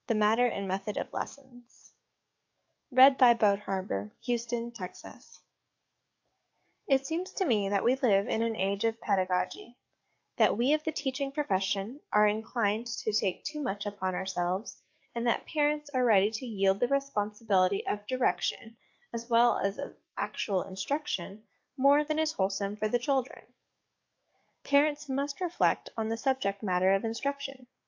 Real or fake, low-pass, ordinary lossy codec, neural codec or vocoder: fake; 7.2 kHz; AAC, 48 kbps; codec, 44.1 kHz, 7.8 kbps, DAC